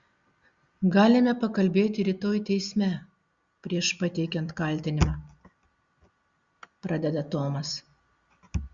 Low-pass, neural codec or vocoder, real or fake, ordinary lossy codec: 7.2 kHz; none; real; Opus, 64 kbps